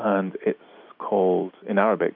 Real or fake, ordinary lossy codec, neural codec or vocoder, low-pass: real; AAC, 48 kbps; none; 5.4 kHz